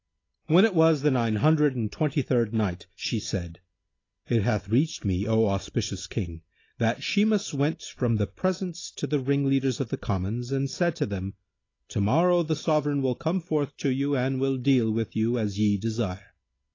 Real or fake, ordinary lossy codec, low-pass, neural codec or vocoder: real; AAC, 32 kbps; 7.2 kHz; none